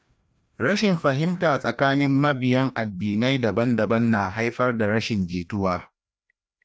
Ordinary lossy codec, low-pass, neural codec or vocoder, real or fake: none; none; codec, 16 kHz, 1 kbps, FreqCodec, larger model; fake